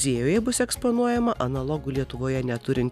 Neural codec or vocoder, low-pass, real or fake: none; 14.4 kHz; real